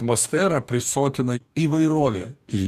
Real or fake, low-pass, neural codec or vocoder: fake; 14.4 kHz; codec, 44.1 kHz, 2.6 kbps, DAC